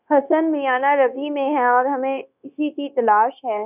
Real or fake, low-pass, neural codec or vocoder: fake; 3.6 kHz; codec, 16 kHz, 0.9 kbps, LongCat-Audio-Codec